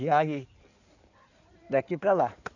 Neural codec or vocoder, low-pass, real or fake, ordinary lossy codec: codec, 16 kHz in and 24 kHz out, 2.2 kbps, FireRedTTS-2 codec; 7.2 kHz; fake; none